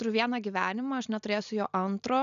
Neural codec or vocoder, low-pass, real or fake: none; 7.2 kHz; real